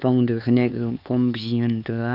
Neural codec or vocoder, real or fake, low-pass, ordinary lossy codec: codec, 16 kHz, 4 kbps, X-Codec, HuBERT features, trained on LibriSpeech; fake; 5.4 kHz; none